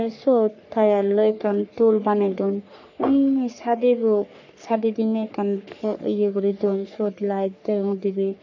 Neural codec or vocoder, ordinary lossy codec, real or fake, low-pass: codec, 44.1 kHz, 3.4 kbps, Pupu-Codec; none; fake; 7.2 kHz